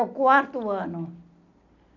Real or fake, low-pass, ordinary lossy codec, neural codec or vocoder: real; 7.2 kHz; none; none